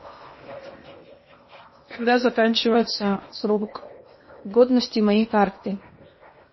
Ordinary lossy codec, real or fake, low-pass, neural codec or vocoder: MP3, 24 kbps; fake; 7.2 kHz; codec, 16 kHz in and 24 kHz out, 0.8 kbps, FocalCodec, streaming, 65536 codes